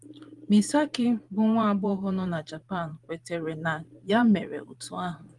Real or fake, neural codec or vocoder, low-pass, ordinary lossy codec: fake; vocoder, 44.1 kHz, 128 mel bands every 512 samples, BigVGAN v2; 10.8 kHz; Opus, 24 kbps